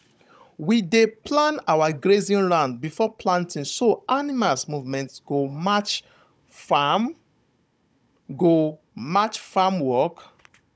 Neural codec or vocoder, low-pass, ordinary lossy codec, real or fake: codec, 16 kHz, 16 kbps, FunCodec, trained on Chinese and English, 50 frames a second; none; none; fake